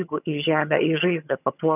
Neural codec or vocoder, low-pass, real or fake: vocoder, 22.05 kHz, 80 mel bands, HiFi-GAN; 3.6 kHz; fake